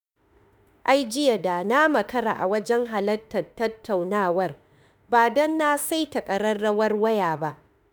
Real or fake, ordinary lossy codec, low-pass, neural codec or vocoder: fake; none; none; autoencoder, 48 kHz, 32 numbers a frame, DAC-VAE, trained on Japanese speech